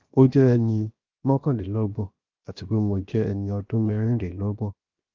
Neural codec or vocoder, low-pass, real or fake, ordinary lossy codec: codec, 16 kHz, 0.7 kbps, FocalCodec; 7.2 kHz; fake; Opus, 32 kbps